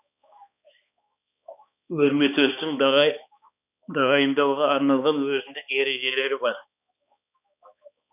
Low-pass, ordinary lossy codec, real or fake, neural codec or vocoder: 3.6 kHz; none; fake; codec, 16 kHz, 2 kbps, X-Codec, HuBERT features, trained on balanced general audio